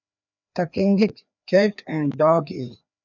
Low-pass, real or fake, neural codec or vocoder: 7.2 kHz; fake; codec, 16 kHz, 2 kbps, FreqCodec, larger model